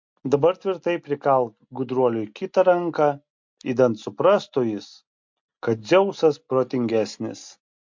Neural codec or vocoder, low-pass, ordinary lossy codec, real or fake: none; 7.2 kHz; MP3, 48 kbps; real